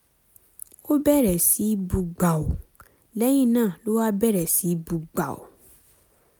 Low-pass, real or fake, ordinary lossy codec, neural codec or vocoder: none; real; none; none